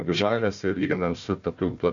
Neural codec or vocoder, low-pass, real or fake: codec, 16 kHz, 1 kbps, FunCodec, trained on Chinese and English, 50 frames a second; 7.2 kHz; fake